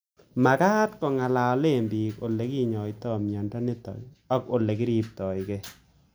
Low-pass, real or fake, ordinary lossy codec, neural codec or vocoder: none; real; none; none